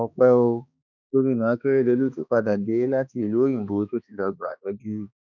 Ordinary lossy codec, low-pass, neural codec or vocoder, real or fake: none; 7.2 kHz; codec, 16 kHz, 2 kbps, X-Codec, HuBERT features, trained on balanced general audio; fake